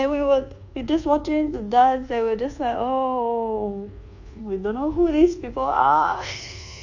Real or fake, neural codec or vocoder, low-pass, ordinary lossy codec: fake; codec, 24 kHz, 1.2 kbps, DualCodec; 7.2 kHz; AAC, 48 kbps